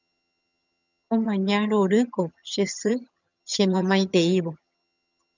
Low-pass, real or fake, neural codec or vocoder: 7.2 kHz; fake; vocoder, 22.05 kHz, 80 mel bands, HiFi-GAN